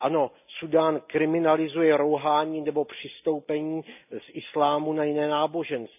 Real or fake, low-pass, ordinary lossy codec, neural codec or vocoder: real; 3.6 kHz; none; none